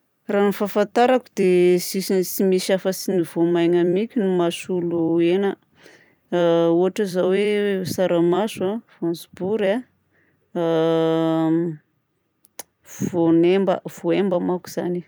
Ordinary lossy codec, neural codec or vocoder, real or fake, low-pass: none; vocoder, 44.1 kHz, 128 mel bands every 256 samples, BigVGAN v2; fake; none